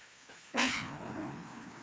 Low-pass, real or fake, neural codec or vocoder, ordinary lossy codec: none; fake; codec, 16 kHz, 2 kbps, FreqCodec, larger model; none